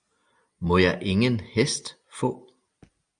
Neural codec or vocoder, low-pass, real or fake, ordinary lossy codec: none; 9.9 kHz; real; Opus, 64 kbps